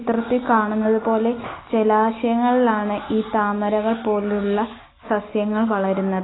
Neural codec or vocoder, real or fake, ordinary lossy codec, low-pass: none; real; AAC, 16 kbps; 7.2 kHz